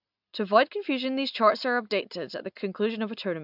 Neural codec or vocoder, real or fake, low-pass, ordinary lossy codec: none; real; 5.4 kHz; AAC, 48 kbps